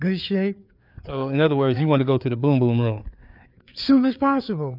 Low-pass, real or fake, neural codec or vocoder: 5.4 kHz; fake; codec, 16 kHz, 4 kbps, FreqCodec, larger model